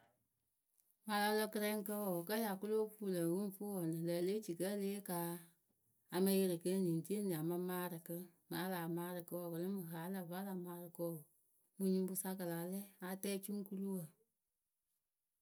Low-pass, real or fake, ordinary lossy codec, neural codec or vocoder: none; real; none; none